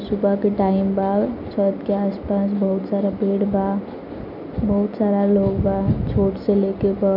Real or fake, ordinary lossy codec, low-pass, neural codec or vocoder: real; none; 5.4 kHz; none